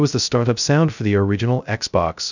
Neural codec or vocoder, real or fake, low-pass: codec, 16 kHz, 0.2 kbps, FocalCodec; fake; 7.2 kHz